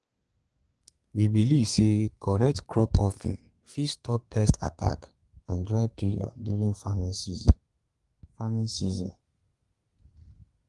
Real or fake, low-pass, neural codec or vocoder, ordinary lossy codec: fake; 10.8 kHz; codec, 32 kHz, 1.9 kbps, SNAC; Opus, 24 kbps